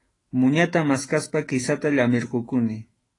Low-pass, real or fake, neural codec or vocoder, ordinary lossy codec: 10.8 kHz; fake; autoencoder, 48 kHz, 128 numbers a frame, DAC-VAE, trained on Japanese speech; AAC, 32 kbps